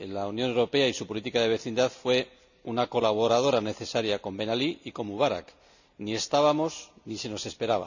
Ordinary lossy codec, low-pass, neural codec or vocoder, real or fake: none; 7.2 kHz; none; real